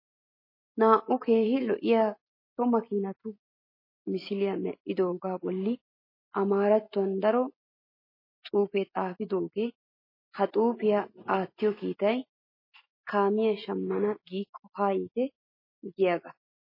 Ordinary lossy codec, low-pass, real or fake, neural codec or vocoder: MP3, 24 kbps; 5.4 kHz; real; none